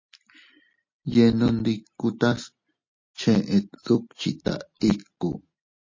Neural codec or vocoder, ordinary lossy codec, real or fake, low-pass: none; MP3, 32 kbps; real; 7.2 kHz